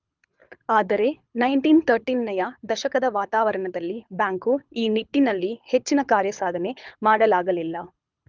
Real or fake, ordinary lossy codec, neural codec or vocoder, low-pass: fake; Opus, 24 kbps; codec, 24 kHz, 6 kbps, HILCodec; 7.2 kHz